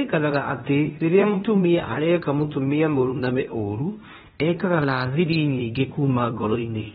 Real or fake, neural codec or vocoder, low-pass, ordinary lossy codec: fake; codec, 16 kHz in and 24 kHz out, 0.9 kbps, LongCat-Audio-Codec, fine tuned four codebook decoder; 10.8 kHz; AAC, 16 kbps